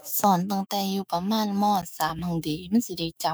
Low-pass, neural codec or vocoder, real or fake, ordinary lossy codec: none; autoencoder, 48 kHz, 128 numbers a frame, DAC-VAE, trained on Japanese speech; fake; none